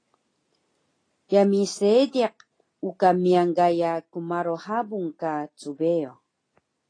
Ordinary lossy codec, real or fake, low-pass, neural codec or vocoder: AAC, 32 kbps; real; 9.9 kHz; none